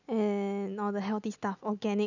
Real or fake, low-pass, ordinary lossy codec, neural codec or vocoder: real; 7.2 kHz; none; none